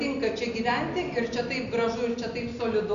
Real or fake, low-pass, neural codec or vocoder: real; 7.2 kHz; none